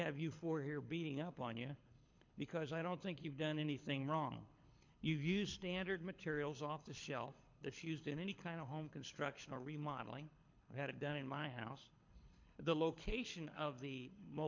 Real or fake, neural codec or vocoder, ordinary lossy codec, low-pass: fake; codec, 24 kHz, 6 kbps, HILCodec; MP3, 48 kbps; 7.2 kHz